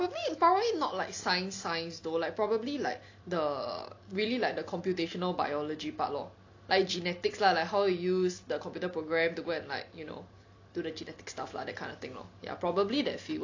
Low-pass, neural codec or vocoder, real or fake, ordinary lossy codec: 7.2 kHz; none; real; AAC, 48 kbps